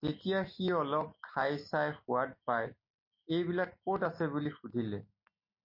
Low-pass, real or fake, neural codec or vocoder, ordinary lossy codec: 5.4 kHz; real; none; MP3, 32 kbps